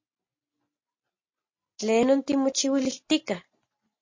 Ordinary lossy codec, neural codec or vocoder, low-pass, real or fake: MP3, 32 kbps; none; 7.2 kHz; real